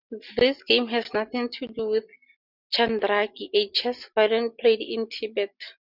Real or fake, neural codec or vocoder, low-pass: real; none; 5.4 kHz